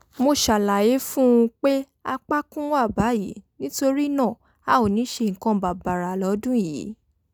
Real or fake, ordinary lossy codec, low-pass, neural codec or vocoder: real; none; none; none